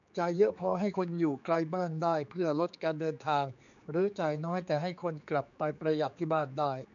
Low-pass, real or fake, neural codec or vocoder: 7.2 kHz; fake; codec, 16 kHz, 4 kbps, X-Codec, HuBERT features, trained on general audio